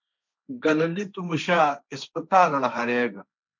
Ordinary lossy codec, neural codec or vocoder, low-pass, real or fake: MP3, 64 kbps; codec, 16 kHz, 1.1 kbps, Voila-Tokenizer; 7.2 kHz; fake